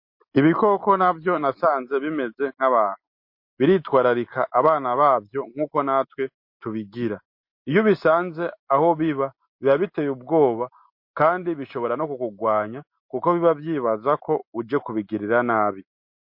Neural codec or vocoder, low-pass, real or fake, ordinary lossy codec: none; 5.4 kHz; real; MP3, 32 kbps